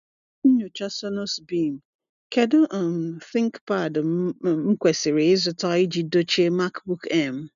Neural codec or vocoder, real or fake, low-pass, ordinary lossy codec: none; real; 7.2 kHz; none